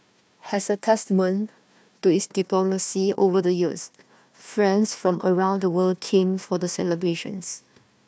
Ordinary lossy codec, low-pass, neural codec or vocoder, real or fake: none; none; codec, 16 kHz, 1 kbps, FunCodec, trained on Chinese and English, 50 frames a second; fake